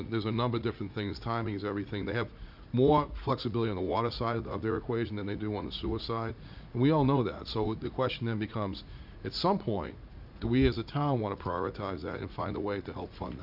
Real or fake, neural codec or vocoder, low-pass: fake; vocoder, 44.1 kHz, 80 mel bands, Vocos; 5.4 kHz